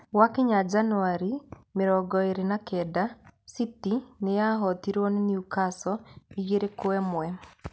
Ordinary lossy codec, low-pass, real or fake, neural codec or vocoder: none; none; real; none